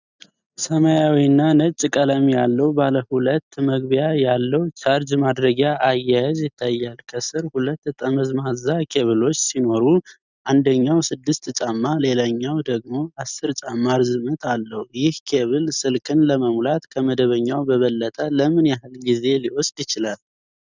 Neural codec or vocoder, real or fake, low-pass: none; real; 7.2 kHz